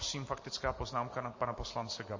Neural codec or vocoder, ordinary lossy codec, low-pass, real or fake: vocoder, 44.1 kHz, 128 mel bands every 512 samples, BigVGAN v2; MP3, 32 kbps; 7.2 kHz; fake